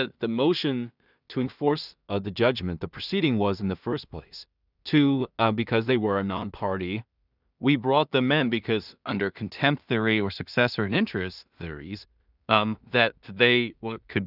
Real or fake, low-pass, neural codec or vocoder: fake; 5.4 kHz; codec, 16 kHz in and 24 kHz out, 0.4 kbps, LongCat-Audio-Codec, two codebook decoder